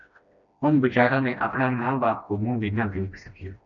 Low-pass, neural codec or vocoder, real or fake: 7.2 kHz; codec, 16 kHz, 1 kbps, FreqCodec, smaller model; fake